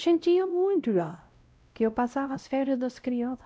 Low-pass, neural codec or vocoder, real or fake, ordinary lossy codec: none; codec, 16 kHz, 0.5 kbps, X-Codec, WavLM features, trained on Multilingual LibriSpeech; fake; none